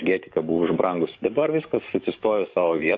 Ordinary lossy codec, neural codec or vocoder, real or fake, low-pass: AAC, 48 kbps; codec, 44.1 kHz, 7.8 kbps, DAC; fake; 7.2 kHz